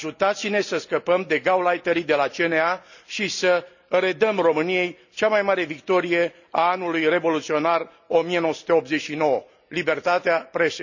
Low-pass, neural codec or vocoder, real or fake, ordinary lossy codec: 7.2 kHz; none; real; none